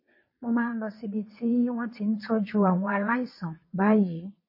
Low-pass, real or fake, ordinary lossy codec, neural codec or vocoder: 5.4 kHz; fake; MP3, 24 kbps; vocoder, 22.05 kHz, 80 mel bands, WaveNeXt